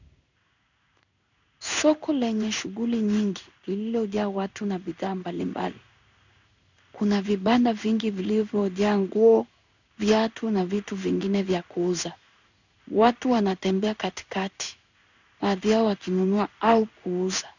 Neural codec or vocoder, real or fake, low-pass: codec, 16 kHz in and 24 kHz out, 1 kbps, XY-Tokenizer; fake; 7.2 kHz